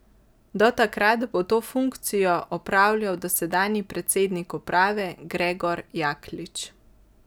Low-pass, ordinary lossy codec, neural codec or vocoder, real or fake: none; none; none; real